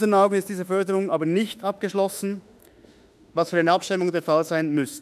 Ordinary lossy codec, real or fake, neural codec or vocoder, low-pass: none; fake; autoencoder, 48 kHz, 32 numbers a frame, DAC-VAE, trained on Japanese speech; 14.4 kHz